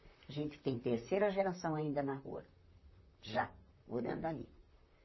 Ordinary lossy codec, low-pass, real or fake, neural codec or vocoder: MP3, 24 kbps; 7.2 kHz; fake; vocoder, 44.1 kHz, 128 mel bands, Pupu-Vocoder